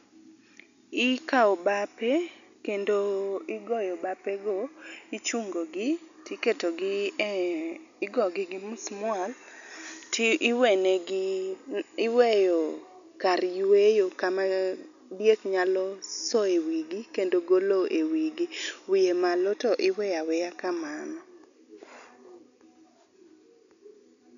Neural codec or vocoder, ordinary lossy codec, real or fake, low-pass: none; none; real; 7.2 kHz